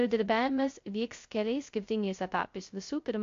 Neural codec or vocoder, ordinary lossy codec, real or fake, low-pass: codec, 16 kHz, 0.2 kbps, FocalCodec; AAC, 48 kbps; fake; 7.2 kHz